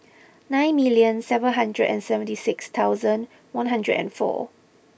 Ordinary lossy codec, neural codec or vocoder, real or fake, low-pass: none; none; real; none